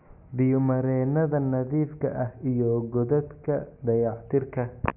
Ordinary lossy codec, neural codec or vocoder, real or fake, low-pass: none; none; real; 3.6 kHz